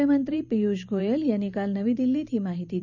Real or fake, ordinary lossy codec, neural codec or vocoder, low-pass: fake; none; vocoder, 44.1 kHz, 128 mel bands every 512 samples, BigVGAN v2; 7.2 kHz